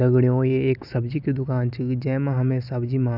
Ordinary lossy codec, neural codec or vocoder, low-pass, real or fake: none; none; 5.4 kHz; real